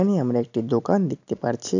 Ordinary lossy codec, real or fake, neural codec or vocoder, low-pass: AAC, 48 kbps; real; none; 7.2 kHz